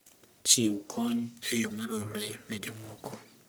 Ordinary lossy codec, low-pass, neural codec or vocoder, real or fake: none; none; codec, 44.1 kHz, 1.7 kbps, Pupu-Codec; fake